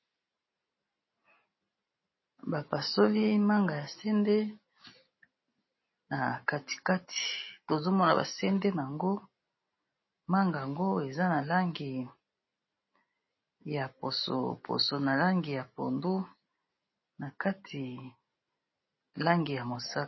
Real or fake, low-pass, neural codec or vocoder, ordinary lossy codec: real; 7.2 kHz; none; MP3, 24 kbps